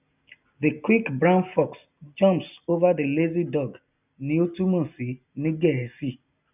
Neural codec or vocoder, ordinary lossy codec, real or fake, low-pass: none; none; real; 3.6 kHz